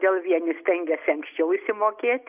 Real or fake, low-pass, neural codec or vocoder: real; 3.6 kHz; none